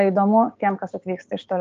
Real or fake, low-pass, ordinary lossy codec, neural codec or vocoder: fake; 7.2 kHz; Opus, 24 kbps; codec, 16 kHz, 6 kbps, DAC